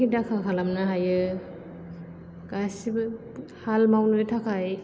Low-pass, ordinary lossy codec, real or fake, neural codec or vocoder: none; none; real; none